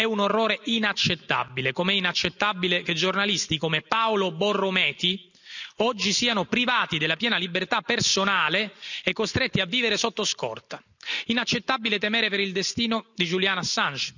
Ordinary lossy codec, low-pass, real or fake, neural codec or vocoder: none; 7.2 kHz; real; none